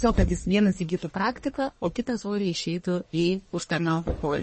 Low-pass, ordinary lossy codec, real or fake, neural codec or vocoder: 10.8 kHz; MP3, 32 kbps; fake; codec, 44.1 kHz, 1.7 kbps, Pupu-Codec